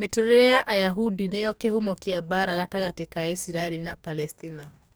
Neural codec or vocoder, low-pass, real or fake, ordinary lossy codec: codec, 44.1 kHz, 2.6 kbps, DAC; none; fake; none